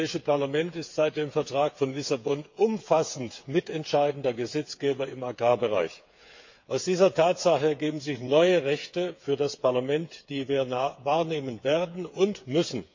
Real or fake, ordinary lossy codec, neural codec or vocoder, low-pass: fake; MP3, 48 kbps; codec, 16 kHz, 8 kbps, FreqCodec, smaller model; 7.2 kHz